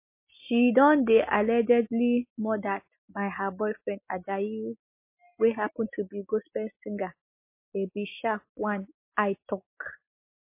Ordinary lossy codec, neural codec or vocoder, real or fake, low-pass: MP3, 24 kbps; none; real; 3.6 kHz